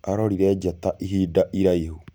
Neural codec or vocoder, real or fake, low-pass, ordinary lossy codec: none; real; none; none